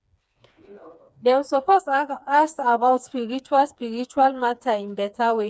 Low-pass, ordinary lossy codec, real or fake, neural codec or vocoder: none; none; fake; codec, 16 kHz, 4 kbps, FreqCodec, smaller model